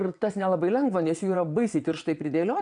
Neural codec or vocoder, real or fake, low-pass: vocoder, 22.05 kHz, 80 mel bands, WaveNeXt; fake; 9.9 kHz